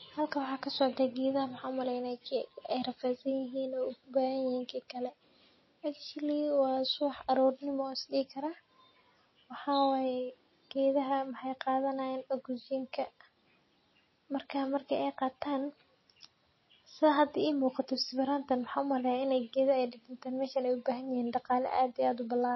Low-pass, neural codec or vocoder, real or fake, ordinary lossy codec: 7.2 kHz; none; real; MP3, 24 kbps